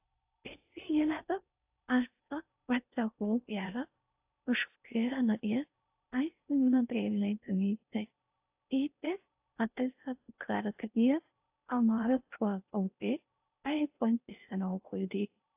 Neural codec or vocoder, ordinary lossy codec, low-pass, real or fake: codec, 16 kHz in and 24 kHz out, 0.6 kbps, FocalCodec, streaming, 4096 codes; AAC, 32 kbps; 3.6 kHz; fake